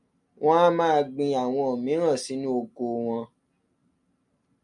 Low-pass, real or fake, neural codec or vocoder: 10.8 kHz; real; none